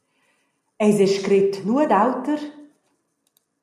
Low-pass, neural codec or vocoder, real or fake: 14.4 kHz; none; real